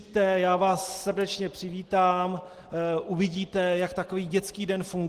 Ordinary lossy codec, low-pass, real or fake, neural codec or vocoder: Opus, 16 kbps; 14.4 kHz; real; none